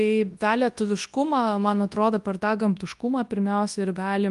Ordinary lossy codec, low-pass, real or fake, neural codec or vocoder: Opus, 32 kbps; 10.8 kHz; fake; codec, 24 kHz, 0.9 kbps, WavTokenizer, large speech release